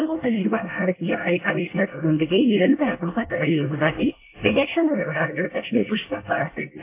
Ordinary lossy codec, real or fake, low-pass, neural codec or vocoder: AAC, 24 kbps; fake; 3.6 kHz; codec, 24 kHz, 1 kbps, SNAC